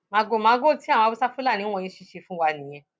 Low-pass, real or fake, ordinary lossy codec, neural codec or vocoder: none; real; none; none